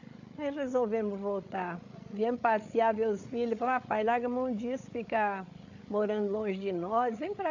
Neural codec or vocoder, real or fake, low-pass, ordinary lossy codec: codec, 16 kHz, 8 kbps, FreqCodec, larger model; fake; 7.2 kHz; none